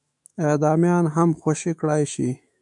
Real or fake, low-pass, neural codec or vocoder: fake; 10.8 kHz; autoencoder, 48 kHz, 128 numbers a frame, DAC-VAE, trained on Japanese speech